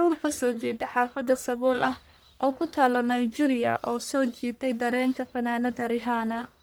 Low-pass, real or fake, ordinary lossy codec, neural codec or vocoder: none; fake; none; codec, 44.1 kHz, 1.7 kbps, Pupu-Codec